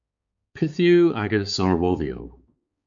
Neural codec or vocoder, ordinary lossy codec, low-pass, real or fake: codec, 16 kHz, 4 kbps, X-Codec, HuBERT features, trained on balanced general audio; MP3, 64 kbps; 7.2 kHz; fake